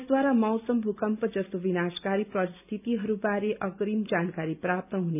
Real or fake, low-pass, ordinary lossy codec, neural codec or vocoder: real; 3.6 kHz; none; none